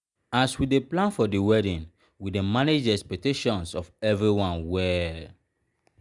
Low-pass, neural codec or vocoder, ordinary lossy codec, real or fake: 10.8 kHz; none; Opus, 64 kbps; real